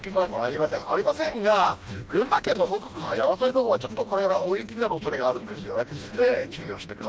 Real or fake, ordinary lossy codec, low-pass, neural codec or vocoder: fake; none; none; codec, 16 kHz, 1 kbps, FreqCodec, smaller model